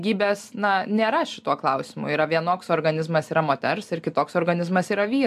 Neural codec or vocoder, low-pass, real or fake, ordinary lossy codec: none; 14.4 kHz; real; AAC, 96 kbps